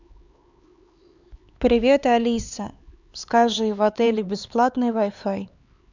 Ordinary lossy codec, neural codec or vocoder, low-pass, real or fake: Opus, 64 kbps; codec, 16 kHz, 4 kbps, X-Codec, HuBERT features, trained on LibriSpeech; 7.2 kHz; fake